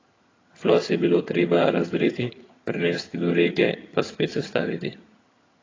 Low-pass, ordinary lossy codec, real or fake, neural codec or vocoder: 7.2 kHz; AAC, 32 kbps; fake; vocoder, 22.05 kHz, 80 mel bands, HiFi-GAN